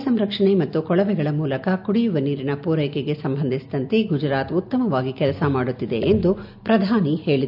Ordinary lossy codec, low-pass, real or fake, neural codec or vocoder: none; 5.4 kHz; real; none